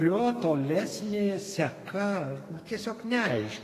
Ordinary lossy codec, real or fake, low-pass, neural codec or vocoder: AAC, 48 kbps; fake; 14.4 kHz; codec, 32 kHz, 1.9 kbps, SNAC